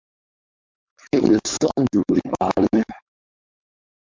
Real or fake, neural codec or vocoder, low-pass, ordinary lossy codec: fake; codec, 16 kHz, 4.8 kbps, FACodec; 7.2 kHz; MP3, 64 kbps